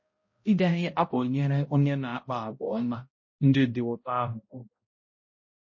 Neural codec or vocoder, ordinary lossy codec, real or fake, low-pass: codec, 16 kHz, 0.5 kbps, X-Codec, HuBERT features, trained on balanced general audio; MP3, 32 kbps; fake; 7.2 kHz